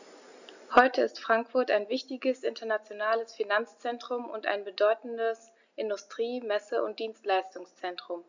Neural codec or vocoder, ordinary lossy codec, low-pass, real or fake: none; none; 7.2 kHz; real